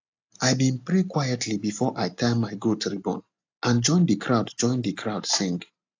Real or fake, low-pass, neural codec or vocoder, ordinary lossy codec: real; 7.2 kHz; none; AAC, 48 kbps